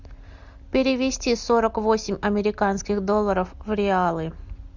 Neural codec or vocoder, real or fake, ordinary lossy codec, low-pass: none; real; Opus, 64 kbps; 7.2 kHz